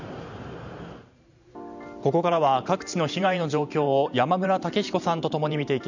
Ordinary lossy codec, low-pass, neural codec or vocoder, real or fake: none; 7.2 kHz; vocoder, 44.1 kHz, 128 mel bands every 512 samples, BigVGAN v2; fake